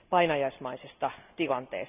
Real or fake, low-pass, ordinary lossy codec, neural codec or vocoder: real; 3.6 kHz; none; none